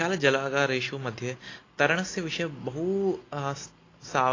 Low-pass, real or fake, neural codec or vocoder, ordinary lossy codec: 7.2 kHz; real; none; AAC, 32 kbps